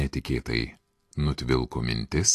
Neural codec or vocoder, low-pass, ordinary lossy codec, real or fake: none; 14.4 kHz; AAC, 48 kbps; real